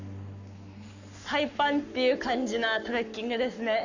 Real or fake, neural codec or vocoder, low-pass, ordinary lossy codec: fake; codec, 44.1 kHz, 7.8 kbps, Pupu-Codec; 7.2 kHz; none